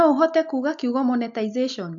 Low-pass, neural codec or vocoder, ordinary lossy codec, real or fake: 7.2 kHz; none; AAC, 64 kbps; real